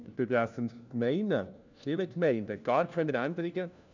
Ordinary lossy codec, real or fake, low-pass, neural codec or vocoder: none; fake; 7.2 kHz; codec, 16 kHz, 1 kbps, FunCodec, trained on LibriTTS, 50 frames a second